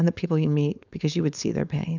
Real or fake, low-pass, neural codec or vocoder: fake; 7.2 kHz; codec, 16 kHz, 4.8 kbps, FACodec